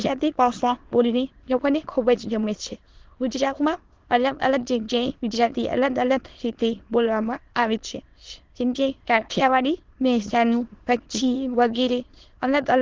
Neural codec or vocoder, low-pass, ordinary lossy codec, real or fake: autoencoder, 22.05 kHz, a latent of 192 numbers a frame, VITS, trained on many speakers; 7.2 kHz; Opus, 16 kbps; fake